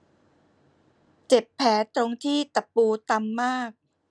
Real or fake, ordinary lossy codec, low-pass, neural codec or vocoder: real; none; 9.9 kHz; none